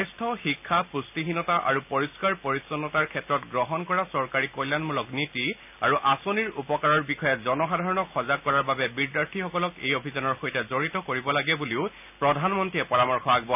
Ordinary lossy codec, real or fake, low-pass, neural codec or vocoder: none; real; 3.6 kHz; none